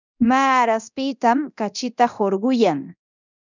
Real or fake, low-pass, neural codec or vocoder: fake; 7.2 kHz; codec, 24 kHz, 0.9 kbps, DualCodec